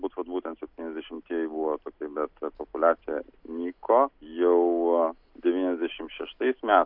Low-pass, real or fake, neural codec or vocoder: 5.4 kHz; real; none